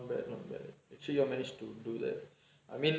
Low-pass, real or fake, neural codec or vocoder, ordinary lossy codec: none; real; none; none